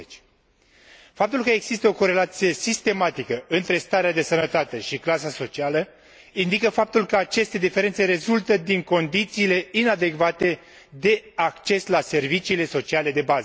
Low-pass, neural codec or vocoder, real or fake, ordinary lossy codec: none; none; real; none